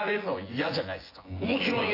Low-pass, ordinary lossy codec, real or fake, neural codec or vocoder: 5.4 kHz; AAC, 24 kbps; fake; vocoder, 24 kHz, 100 mel bands, Vocos